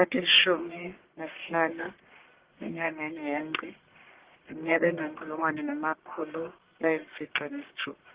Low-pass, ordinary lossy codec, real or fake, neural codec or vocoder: 3.6 kHz; Opus, 16 kbps; fake; codec, 44.1 kHz, 1.7 kbps, Pupu-Codec